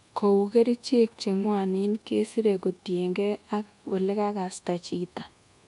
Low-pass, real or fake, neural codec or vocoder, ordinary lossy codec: 10.8 kHz; fake; codec, 24 kHz, 1.2 kbps, DualCodec; none